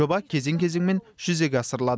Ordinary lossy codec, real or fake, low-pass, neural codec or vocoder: none; real; none; none